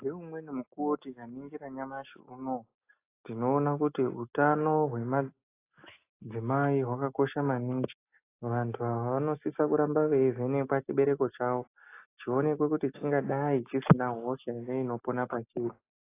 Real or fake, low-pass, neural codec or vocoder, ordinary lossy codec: real; 3.6 kHz; none; AAC, 24 kbps